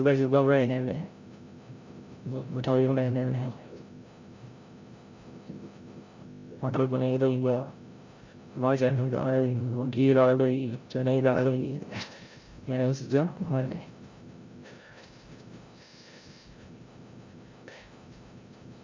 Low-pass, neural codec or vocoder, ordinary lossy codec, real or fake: 7.2 kHz; codec, 16 kHz, 0.5 kbps, FreqCodec, larger model; MP3, 48 kbps; fake